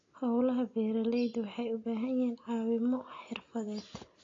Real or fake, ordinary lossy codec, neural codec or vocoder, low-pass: real; AAC, 32 kbps; none; 7.2 kHz